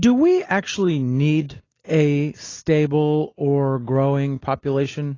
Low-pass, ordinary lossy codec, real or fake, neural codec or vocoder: 7.2 kHz; AAC, 32 kbps; real; none